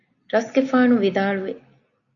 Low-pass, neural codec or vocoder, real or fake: 7.2 kHz; none; real